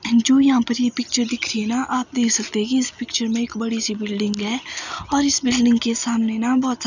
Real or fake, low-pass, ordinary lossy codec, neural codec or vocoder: real; 7.2 kHz; none; none